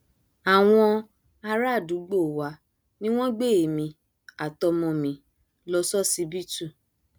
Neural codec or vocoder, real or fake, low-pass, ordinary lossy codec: none; real; none; none